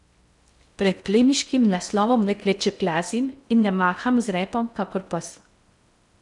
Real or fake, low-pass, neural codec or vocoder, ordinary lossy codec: fake; 10.8 kHz; codec, 16 kHz in and 24 kHz out, 0.6 kbps, FocalCodec, streaming, 4096 codes; none